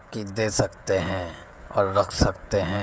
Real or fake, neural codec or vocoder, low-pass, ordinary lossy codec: fake; codec, 16 kHz, 16 kbps, FreqCodec, smaller model; none; none